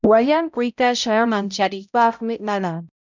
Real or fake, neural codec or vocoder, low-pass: fake; codec, 16 kHz, 0.5 kbps, X-Codec, HuBERT features, trained on balanced general audio; 7.2 kHz